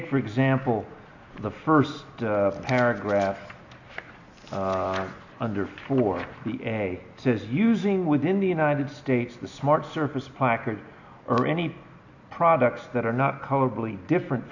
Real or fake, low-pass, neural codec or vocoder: real; 7.2 kHz; none